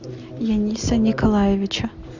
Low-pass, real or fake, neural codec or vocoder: 7.2 kHz; real; none